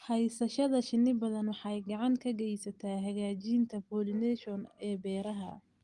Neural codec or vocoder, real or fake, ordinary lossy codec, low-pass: none; real; Opus, 24 kbps; 10.8 kHz